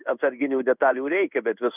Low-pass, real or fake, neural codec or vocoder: 3.6 kHz; fake; codec, 16 kHz in and 24 kHz out, 1 kbps, XY-Tokenizer